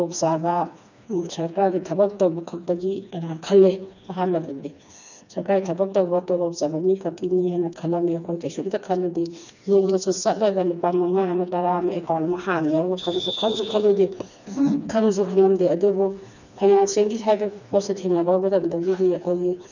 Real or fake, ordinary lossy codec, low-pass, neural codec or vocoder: fake; none; 7.2 kHz; codec, 16 kHz, 2 kbps, FreqCodec, smaller model